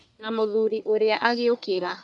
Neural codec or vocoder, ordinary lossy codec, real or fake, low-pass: codec, 44.1 kHz, 1.7 kbps, Pupu-Codec; none; fake; 10.8 kHz